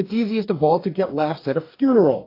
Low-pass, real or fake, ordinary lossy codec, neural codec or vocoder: 5.4 kHz; fake; AAC, 32 kbps; codec, 44.1 kHz, 2.6 kbps, DAC